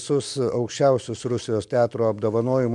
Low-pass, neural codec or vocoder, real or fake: 10.8 kHz; vocoder, 44.1 kHz, 128 mel bands every 256 samples, BigVGAN v2; fake